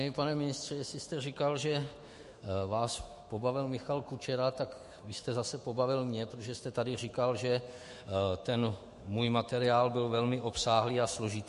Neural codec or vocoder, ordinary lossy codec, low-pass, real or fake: autoencoder, 48 kHz, 128 numbers a frame, DAC-VAE, trained on Japanese speech; MP3, 48 kbps; 14.4 kHz; fake